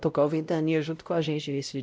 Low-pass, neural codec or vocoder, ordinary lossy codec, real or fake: none; codec, 16 kHz, 0.5 kbps, X-Codec, WavLM features, trained on Multilingual LibriSpeech; none; fake